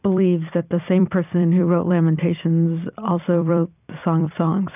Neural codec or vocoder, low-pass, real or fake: vocoder, 44.1 kHz, 128 mel bands every 256 samples, BigVGAN v2; 3.6 kHz; fake